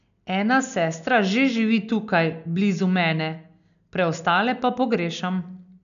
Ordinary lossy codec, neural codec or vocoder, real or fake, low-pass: none; none; real; 7.2 kHz